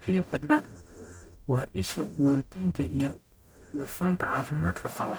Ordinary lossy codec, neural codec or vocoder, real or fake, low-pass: none; codec, 44.1 kHz, 0.9 kbps, DAC; fake; none